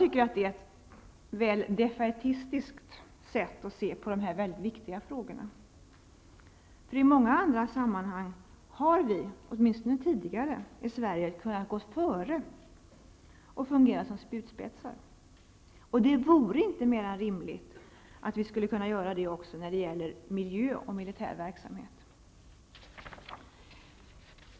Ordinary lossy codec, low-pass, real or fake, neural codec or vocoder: none; none; real; none